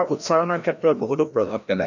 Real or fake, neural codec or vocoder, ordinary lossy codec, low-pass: fake; codec, 16 kHz, 1 kbps, FreqCodec, larger model; none; 7.2 kHz